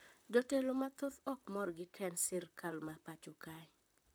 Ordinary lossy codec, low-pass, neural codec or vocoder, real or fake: none; none; vocoder, 44.1 kHz, 128 mel bands, Pupu-Vocoder; fake